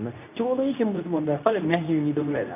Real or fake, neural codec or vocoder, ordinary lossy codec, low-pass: fake; codec, 24 kHz, 0.9 kbps, WavTokenizer, medium speech release version 2; none; 3.6 kHz